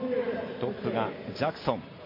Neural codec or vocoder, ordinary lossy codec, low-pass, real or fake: none; MP3, 24 kbps; 5.4 kHz; real